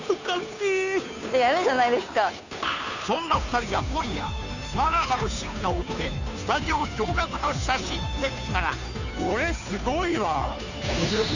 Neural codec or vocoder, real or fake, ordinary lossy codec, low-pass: codec, 16 kHz, 2 kbps, FunCodec, trained on Chinese and English, 25 frames a second; fake; none; 7.2 kHz